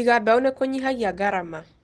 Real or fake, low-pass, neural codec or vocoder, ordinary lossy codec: real; 9.9 kHz; none; Opus, 16 kbps